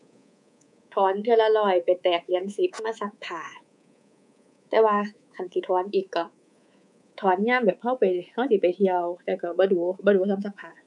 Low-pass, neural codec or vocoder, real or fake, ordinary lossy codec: 10.8 kHz; codec, 24 kHz, 3.1 kbps, DualCodec; fake; none